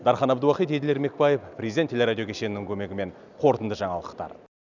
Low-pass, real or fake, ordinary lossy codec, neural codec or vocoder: 7.2 kHz; real; none; none